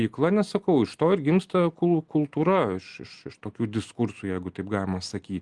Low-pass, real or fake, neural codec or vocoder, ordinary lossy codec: 10.8 kHz; real; none; Opus, 24 kbps